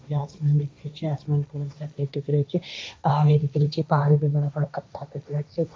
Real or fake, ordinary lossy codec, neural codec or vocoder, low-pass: fake; none; codec, 16 kHz, 1.1 kbps, Voila-Tokenizer; none